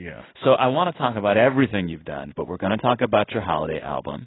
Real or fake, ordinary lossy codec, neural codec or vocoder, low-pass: fake; AAC, 16 kbps; codec, 16 kHz in and 24 kHz out, 1 kbps, XY-Tokenizer; 7.2 kHz